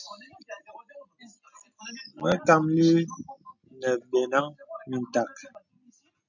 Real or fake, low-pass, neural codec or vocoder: real; 7.2 kHz; none